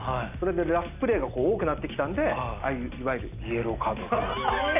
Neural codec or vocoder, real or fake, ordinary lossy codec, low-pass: none; real; none; 3.6 kHz